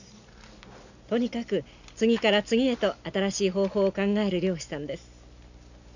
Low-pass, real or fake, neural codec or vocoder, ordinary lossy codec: 7.2 kHz; real; none; none